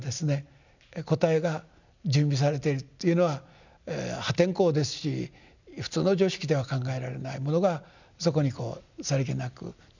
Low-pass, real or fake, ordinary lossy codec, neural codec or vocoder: 7.2 kHz; real; none; none